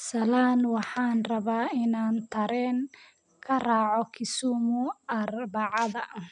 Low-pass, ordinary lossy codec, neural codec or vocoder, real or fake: 9.9 kHz; none; none; real